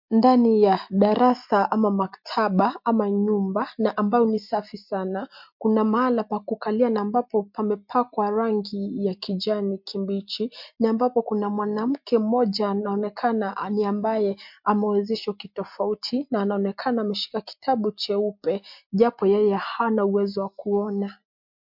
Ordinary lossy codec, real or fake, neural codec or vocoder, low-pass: MP3, 48 kbps; real; none; 5.4 kHz